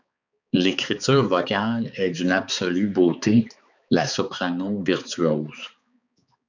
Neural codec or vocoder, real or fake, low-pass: codec, 16 kHz, 4 kbps, X-Codec, HuBERT features, trained on balanced general audio; fake; 7.2 kHz